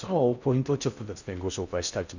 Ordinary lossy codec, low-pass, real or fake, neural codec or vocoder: none; 7.2 kHz; fake; codec, 16 kHz in and 24 kHz out, 0.6 kbps, FocalCodec, streaming, 4096 codes